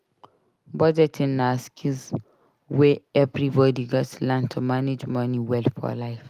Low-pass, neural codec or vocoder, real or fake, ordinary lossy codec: 14.4 kHz; none; real; Opus, 32 kbps